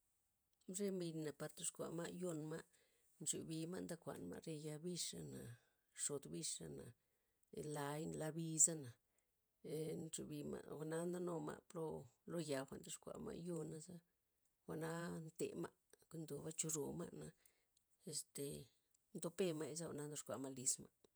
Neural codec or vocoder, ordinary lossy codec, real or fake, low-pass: vocoder, 48 kHz, 128 mel bands, Vocos; none; fake; none